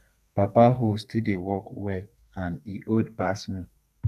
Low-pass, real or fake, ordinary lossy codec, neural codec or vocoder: 14.4 kHz; fake; none; codec, 32 kHz, 1.9 kbps, SNAC